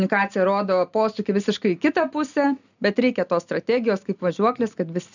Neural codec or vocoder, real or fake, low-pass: none; real; 7.2 kHz